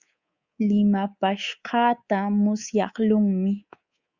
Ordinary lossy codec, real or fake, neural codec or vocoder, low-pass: Opus, 64 kbps; fake; codec, 24 kHz, 3.1 kbps, DualCodec; 7.2 kHz